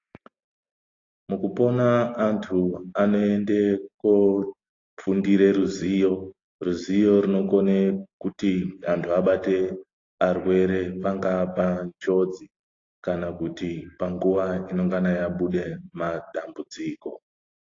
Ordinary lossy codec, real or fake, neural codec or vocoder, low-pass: AAC, 32 kbps; real; none; 7.2 kHz